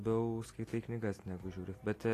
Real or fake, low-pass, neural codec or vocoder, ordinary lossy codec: real; 14.4 kHz; none; AAC, 48 kbps